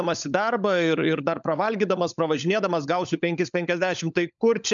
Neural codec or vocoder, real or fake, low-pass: codec, 16 kHz, 16 kbps, FunCodec, trained on LibriTTS, 50 frames a second; fake; 7.2 kHz